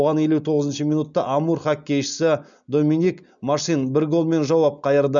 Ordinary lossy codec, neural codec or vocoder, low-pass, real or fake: none; none; 7.2 kHz; real